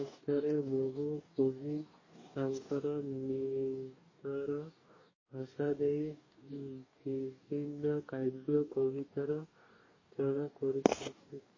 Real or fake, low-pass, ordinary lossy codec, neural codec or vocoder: fake; 7.2 kHz; MP3, 32 kbps; codec, 44.1 kHz, 2.6 kbps, DAC